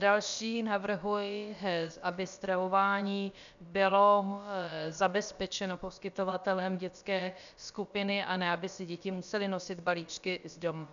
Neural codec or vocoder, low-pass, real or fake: codec, 16 kHz, about 1 kbps, DyCAST, with the encoder's durations; 7.2 kHz; fake